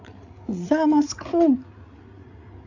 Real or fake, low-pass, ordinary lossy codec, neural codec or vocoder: fake; 7.2 kHz; none; codec, 16 kHz, 8 kbps, FreqCodec, larger model